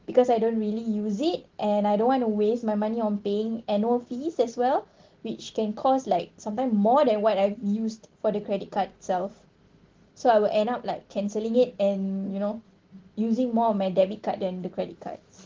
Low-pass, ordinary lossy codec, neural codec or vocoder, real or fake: 7.2 kHz; Opus, 16 kbps; none; real